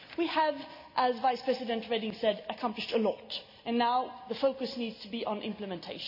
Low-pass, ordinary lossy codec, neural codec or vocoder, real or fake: 5.4 kHz; none; none; real